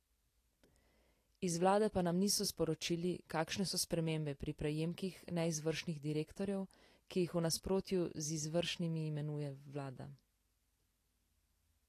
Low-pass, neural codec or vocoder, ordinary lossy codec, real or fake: 14.4 kHz; none; AAC, 48 kbps; real